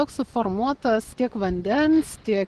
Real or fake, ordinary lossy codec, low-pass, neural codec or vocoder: fake; Opus, 16 kbps; 10.8 kHz; vocoder, 24 kHz, 100 mel bands, Vocos